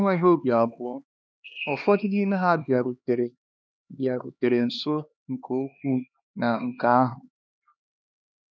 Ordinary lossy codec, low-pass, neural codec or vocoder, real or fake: none; none; codec, 16 kHz, 2 kbps, X-Codec, HuBERT features, trained on LibriSpeech; fake